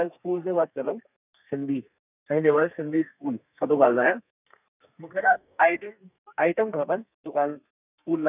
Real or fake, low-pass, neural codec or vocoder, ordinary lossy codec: fake; 3.6 kHz; codec, 32 kHz, 1.9 kbps, SNAC; none